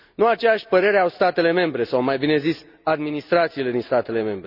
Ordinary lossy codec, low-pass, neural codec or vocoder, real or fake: none; 5.4 kHz; none; real